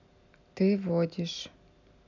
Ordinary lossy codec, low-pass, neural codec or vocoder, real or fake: none; 7.2 kHz; none; real